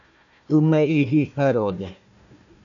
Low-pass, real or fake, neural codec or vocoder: 7.2 kHz; fake; codec, 16 kHz, 1 kbps, FunCodec, trained on Chinese and English, 50 frames a second